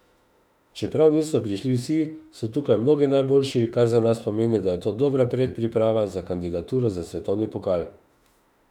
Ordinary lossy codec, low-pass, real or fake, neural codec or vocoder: none; 19.8 kHz; fake; autoencoder, 48 kHz, 32 numbers a frame, DAC-VAE, trained on Japanese speech